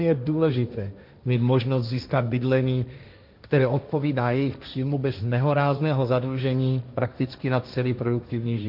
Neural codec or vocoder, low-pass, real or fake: codec, 16 kHz, 1.1 kbps, Voila-Tokenizer; 5.4 kHz; fake